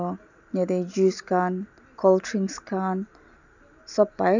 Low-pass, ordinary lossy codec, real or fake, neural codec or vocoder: 7.2 kHz; none; real; none